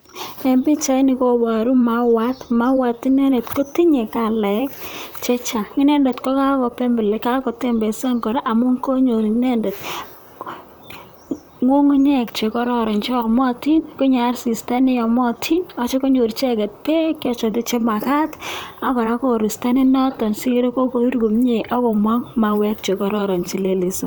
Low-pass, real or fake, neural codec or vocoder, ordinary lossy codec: none; fake; vocoder, 44.1 kHz, 128 mel bands, Pupu-Vocoder; none